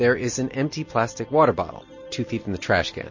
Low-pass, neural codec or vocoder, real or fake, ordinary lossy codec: 7.2 kHz; none; real; MP3, 32 kbps